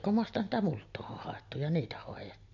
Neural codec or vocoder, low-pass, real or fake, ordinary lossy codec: none; 7.2 kHz; real; MP3, 48 kbps